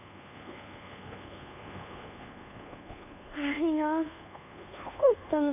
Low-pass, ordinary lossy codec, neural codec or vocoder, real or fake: 3.6 kHz; none; codec, 24 kHz, 1.2 kbps, DualCodec; fake